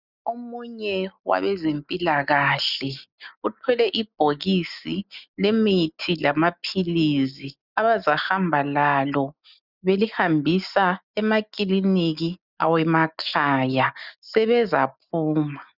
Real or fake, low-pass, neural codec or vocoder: real; 5.4 kHz; none